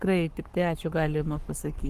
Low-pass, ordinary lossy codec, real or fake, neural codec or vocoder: 14.4 kHz; Opus, 24 kbps; fake; codec, 44.1 kHz, 7.8 kbps, Pupu-Codec